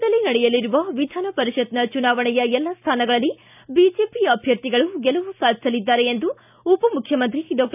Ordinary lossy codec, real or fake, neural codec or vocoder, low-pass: none; real; none; 3.6 kHz